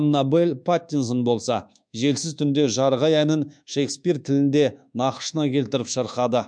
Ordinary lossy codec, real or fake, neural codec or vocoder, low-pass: MP3, 64 kbps; fake; codec, 24 kHz, 1.2 kbps, DualCodec; 9.9 kHz